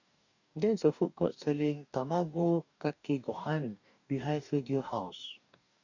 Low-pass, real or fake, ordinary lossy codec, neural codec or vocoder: 7.2 kHz; fake; none; codec, 44.1 kHz, 2.6 kbps, DAC